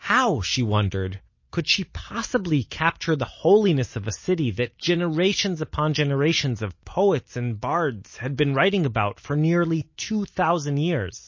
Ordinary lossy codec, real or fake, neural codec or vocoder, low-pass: MP3, 32 kbps; real; none; 7.2 kHz